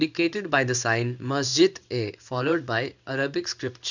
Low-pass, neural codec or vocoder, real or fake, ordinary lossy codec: 7.2 kHz; codec, 16 kHz in and 24 kHz out, 1 kbps, XY-Tokenizer; fake; none